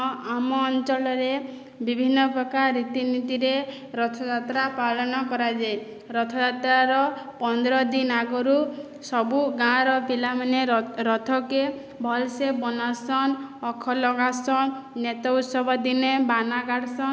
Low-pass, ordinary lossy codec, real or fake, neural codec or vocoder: none; none; real; none